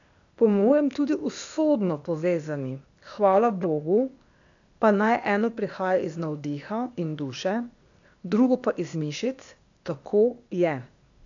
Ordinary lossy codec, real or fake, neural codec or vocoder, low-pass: none; fake; codec, 16 kHz, 0.8 kbps, ZipCodec; 7.2 kHz